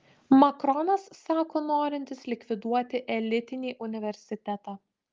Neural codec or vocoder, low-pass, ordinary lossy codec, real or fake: none; 7.2 kHz; Opus, 24 kbps; real